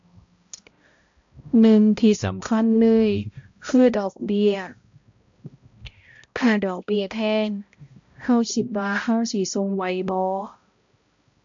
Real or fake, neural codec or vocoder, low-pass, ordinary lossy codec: fake; codec, 16 kHz, 0.5 kbps, X-Codec, HuBERT features, trained on balanced general audio; 7.2 kHz; AAC, 64 kbps